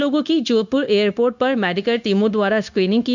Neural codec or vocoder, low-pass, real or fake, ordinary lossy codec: codec, 16 kHz, 0.9 kbps, LongCat-Audio-Codec; 7.2 kHz; fake; none